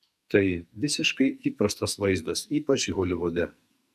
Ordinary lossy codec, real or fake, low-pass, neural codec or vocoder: AAC, 96 kbps; fake; 14.4 kHz; codec, 44.1 kHz, 2.6 kbps, SNAC